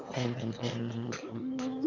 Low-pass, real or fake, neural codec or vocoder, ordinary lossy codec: 7.2 kHz; fake; autoencoder, 22.05 kHz, a latent of 192 numbers a frame, VITS, trained on one speaker; none